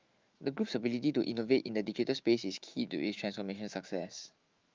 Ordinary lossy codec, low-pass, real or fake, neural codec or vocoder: Opus, 32 kbps; 7.2 kHz; fake; autoencoder, 48 kHz, 128 numbers a frame, DAC-VAE, trained on Japanese speech